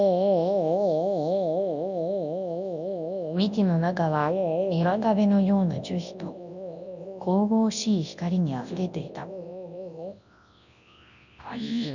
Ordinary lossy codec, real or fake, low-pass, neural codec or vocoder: none; fake; 7.2 kHz; codec, 24 kHz, 0.9 kbps, WavTokenizer, large speech release